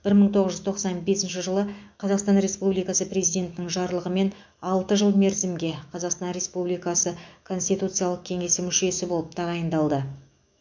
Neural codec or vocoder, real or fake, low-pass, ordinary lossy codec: autoencoder, 48 kHz, 128 numbers a frame, DAC-VAE, trained on Japanese speech; fake; 7.2 kHz; MP3, 64 kbps